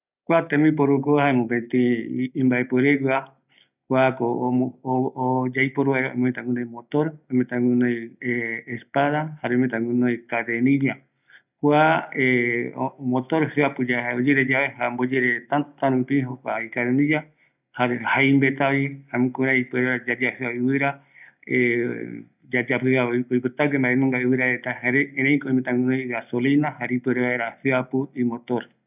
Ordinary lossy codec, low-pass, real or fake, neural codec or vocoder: none; 3.6 kHz; real; none